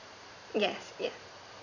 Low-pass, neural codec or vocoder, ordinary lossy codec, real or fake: 7.2 kHz; vocoder, 44.1 kHz, 128 mel bands every 512 samples, BigVGAN v2; none; fake